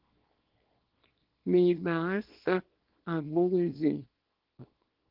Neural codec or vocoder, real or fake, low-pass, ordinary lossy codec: codec, 24 kHz, 0.9 kbps, WavTokenizer, small release; fake; 5.4 kHz; Opus, 16 kbps